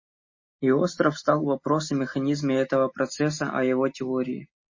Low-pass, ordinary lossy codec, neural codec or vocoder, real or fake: 7.2 kHz; MP3, 32 kbps; none; real